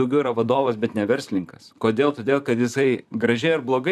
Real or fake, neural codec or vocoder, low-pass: fake; vocoder, 44.1 kHz, 128 mel bands, Pupu-Vocoder; 14.4 kHz